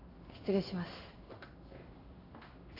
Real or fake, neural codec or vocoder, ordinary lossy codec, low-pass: fake; codec, 16 kHz in and 24 kHz out, 1 kbps, XY-Tokenizer; AAC, 48 kbps; 5.4 kHz